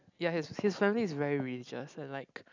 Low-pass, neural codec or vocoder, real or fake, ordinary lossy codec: 7.2 kHz; none; real; none